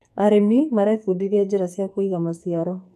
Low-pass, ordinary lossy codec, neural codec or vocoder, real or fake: 14.4 kHz; none; codec, 44.1 kHz, 2.6 kbps, SNAC; fake